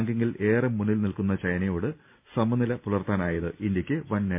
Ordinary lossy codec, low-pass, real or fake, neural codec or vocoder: MP3, 32 kbps; 3.6 kHz; real; none